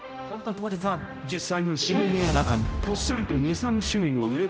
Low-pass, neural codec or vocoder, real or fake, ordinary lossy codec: none; codec, 16 kHz, 0.5 kbps, X-Codec, HuBERT features, trained on general audio; fake; none